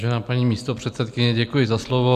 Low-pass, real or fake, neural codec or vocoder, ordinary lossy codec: 14.4 kHz; real; none; MP3, 96 kbps